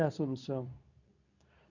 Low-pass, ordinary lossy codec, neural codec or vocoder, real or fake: 7.2 kHz; none; codec, 24 kHz, 0.9 kbps, WavTokenizer, medium speech release version 2; fake